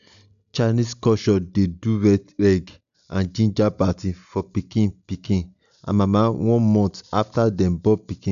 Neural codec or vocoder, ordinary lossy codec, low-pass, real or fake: none; none; 7.2 kHz; real